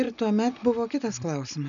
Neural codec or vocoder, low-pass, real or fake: none; 7.2 kHz; real